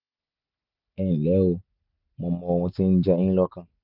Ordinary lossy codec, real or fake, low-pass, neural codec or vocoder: none; real; 5.4 kHz; none